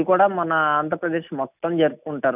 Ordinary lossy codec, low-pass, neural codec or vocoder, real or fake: none; 3.6 kHz; none; real